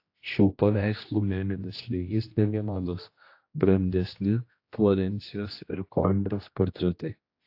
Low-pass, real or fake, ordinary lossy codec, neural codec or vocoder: 5.4 kHz; fake; AAC, 32 kbps; codec, 16 kHz, 1 kbps, X-Codec, HuBERT features, trained on general audio